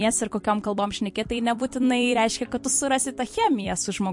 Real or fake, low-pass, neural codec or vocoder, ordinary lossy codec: fake; 10.8 kHz; vocoder, 44.1 kHz, 128 mel bands every 256 samples, BigVGAN v2; MP3, 48 kbps